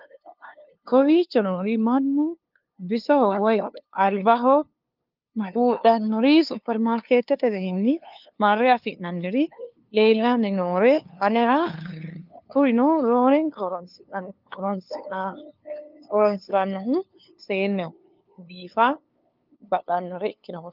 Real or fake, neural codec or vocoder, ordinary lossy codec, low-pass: fake; codec, 16 kHz, 2 kbps, FunCodec, trained on LibriTTS, 25 frames a second; Opus, 24 kbps; 5.4 kHz